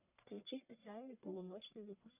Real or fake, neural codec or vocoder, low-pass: fake; codec, 44.1 kHz, 1.7 kbps, Pupu-Codec; 3.6 kHz